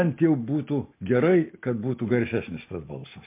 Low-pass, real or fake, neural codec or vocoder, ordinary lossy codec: 3.6 kHz; real; none; MP3, 24 kbps